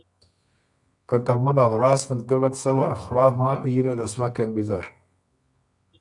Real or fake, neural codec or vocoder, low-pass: fake; codec, 24 kHz, 0.9 kbps, WavTokenizer, medium music audio release; 10.8 kHz